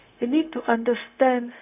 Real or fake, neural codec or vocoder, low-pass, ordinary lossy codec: fake; codec, 16 kHz, 0.4 kbps, LongCat-Audio-Codec; 3.6 kHz; none